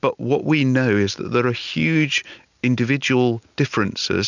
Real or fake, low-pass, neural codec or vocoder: real; 7.2 kHz; none